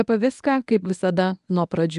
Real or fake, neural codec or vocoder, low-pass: fake; codec, 24 kHz, 0.9 kbps, WavTokenizer, medium speech release version 1; 10.8 kHz